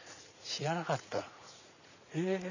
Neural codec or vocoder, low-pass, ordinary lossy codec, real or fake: vocoder, 44.1 kHz, 80 mel bands, Vocos; 7.2 kHz; none; fake